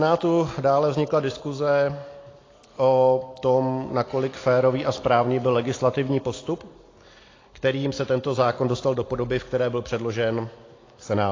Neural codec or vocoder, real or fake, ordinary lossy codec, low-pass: none; real; AAC, 32 kbps; 7.2 kHz